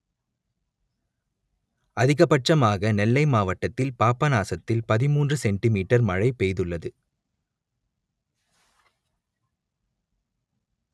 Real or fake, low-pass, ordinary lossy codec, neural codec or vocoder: real; none; none; none